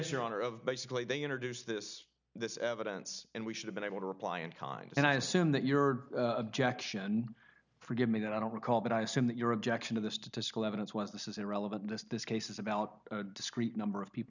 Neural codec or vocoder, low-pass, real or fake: none; 7.2 kHz; real